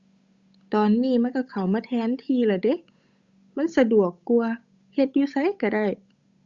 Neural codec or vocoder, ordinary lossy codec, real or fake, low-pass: codec, 16 kHz, 8 kbps, FunCodec, trained on Chinese and English, 25 frames a second; Opus, 64 kbps; fake; 7.2 kHz